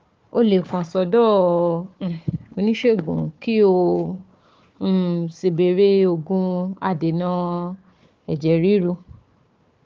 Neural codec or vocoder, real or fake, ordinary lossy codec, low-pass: codec, 16 kHz, 4 kbps, FunCodec, trained on Chinese and English, 50 frames a second; fake; Opus, 24 kbps; 7.2 kHz